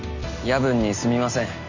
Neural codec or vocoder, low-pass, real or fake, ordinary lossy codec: none; 7.2 kHz; real; none